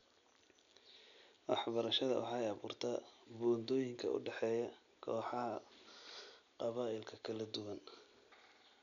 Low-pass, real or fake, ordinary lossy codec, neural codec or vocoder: 7.2 kHz; real; none; none